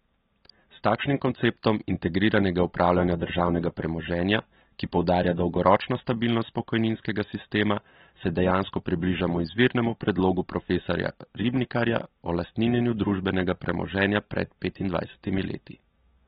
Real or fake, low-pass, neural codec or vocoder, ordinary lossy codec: real; 7.2 kHz; none; AAC, 16 kbps